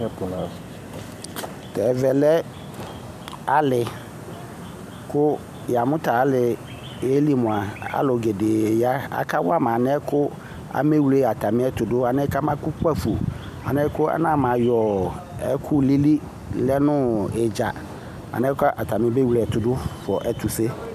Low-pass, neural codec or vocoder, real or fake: 14.4 kHz; none; real